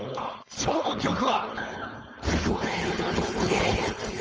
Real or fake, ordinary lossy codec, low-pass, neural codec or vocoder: fake; Opus, 24 kbps; 7.2 kHz; codec, 16 kHz, 4.8 kbps, FACodec